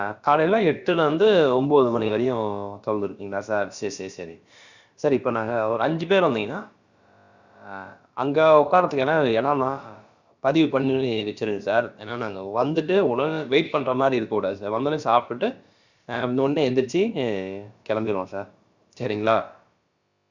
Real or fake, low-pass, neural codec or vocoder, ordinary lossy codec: fake; 7.2 kHz; codec, 16 kHz, about 1 kbps, DyCAST, with the encoder's durations; Opus, 64 kbps